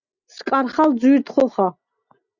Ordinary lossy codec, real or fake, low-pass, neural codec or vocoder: Opus, 64 kbps; real; 7.2 kHz; none